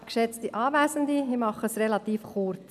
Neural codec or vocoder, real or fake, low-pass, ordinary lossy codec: none; real; 14.4 kHz; none